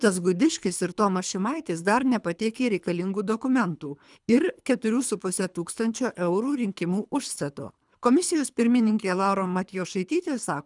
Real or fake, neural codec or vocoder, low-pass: fake; codec, 24 kHz, 3 kbps, HILCodec; 10.8 kHz